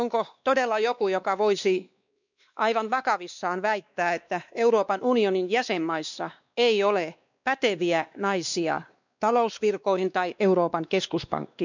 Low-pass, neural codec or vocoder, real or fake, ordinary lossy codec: 7.2 kHz; codec, 16 kHz, 2 kbps, X-Codec, WavLM features, trained on Multilingual LibriSpeech; fake; none